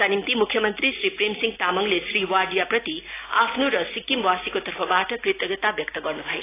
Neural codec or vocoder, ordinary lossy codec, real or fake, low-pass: none; AAC, 16 kbps; real; 3.6 kHz